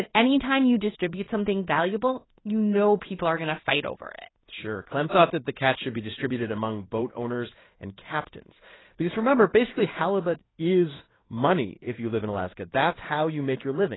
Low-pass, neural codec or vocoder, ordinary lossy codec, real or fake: 7.2 kHz; codec, 16 kHz in and 24 kHz out, 1 kbps, XY-Tokenizer; AAC, 16 kbps; fake